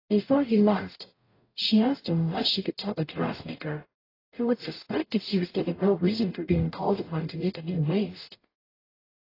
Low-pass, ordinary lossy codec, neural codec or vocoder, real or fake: 5.4 kHz; AAC, 24 kbps; codec, 44.1 kHz, 0.9 kbps, DAC; fake